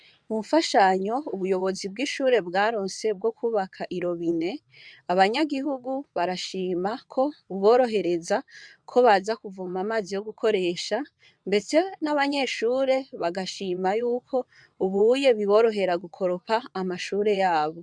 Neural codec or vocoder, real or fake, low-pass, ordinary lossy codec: vocoder, 22.05 kHz, 80 mel bands, WaveNeXt; fake; 9.9 kHz; MP3, 96 kbps